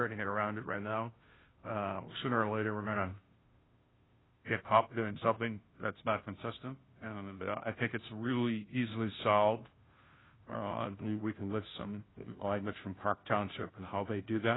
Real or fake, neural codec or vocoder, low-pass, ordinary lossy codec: fake; codec, 16 kHz, 0.5 kbps, FunCodec, trained on Chinese and English, 25 frames a second; 7.2 kHz; AAC, 16 kbps